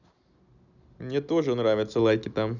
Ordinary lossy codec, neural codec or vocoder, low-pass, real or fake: none; none; 7.2 kHz; real